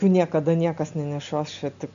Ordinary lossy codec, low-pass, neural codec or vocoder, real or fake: AAC, 96 kbps; 7.2 kHz; none; real